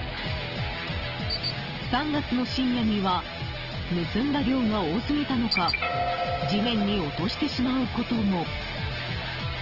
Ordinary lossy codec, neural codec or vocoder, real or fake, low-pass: Opus, 16 kbps; none; real; 5.4 kHz